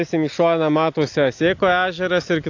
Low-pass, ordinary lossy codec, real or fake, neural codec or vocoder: 7.2 kHz; AAC, 48 kbps; real; none